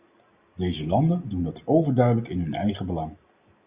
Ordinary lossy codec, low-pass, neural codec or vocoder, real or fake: Opus, 64 kbps; 3.6 kHz; none; real